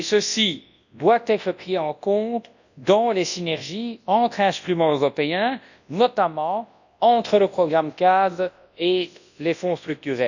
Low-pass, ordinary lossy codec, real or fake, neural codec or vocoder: 7.2 kHz; none; fake; codec, 24 kHz, 0.9 kbps, WavTokenizer, large speech release